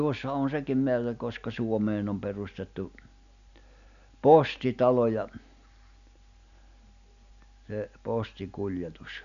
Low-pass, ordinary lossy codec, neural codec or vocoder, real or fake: 7.2 kHz; none; none; real